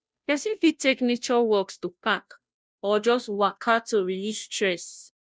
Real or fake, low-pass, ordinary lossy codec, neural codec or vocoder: fake; none; none; codec, 16 kHz, 0.5 kbps, FunCodec, trained on Chinese and English, 25 frames a second